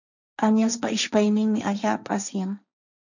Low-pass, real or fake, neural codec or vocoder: 7.2 kHz; fake; codec, 16 kHz, 1.1 kbps, Voila-Tokenizer